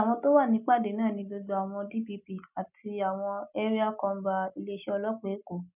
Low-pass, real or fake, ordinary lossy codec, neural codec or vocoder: 3.6 kHz; real; none; none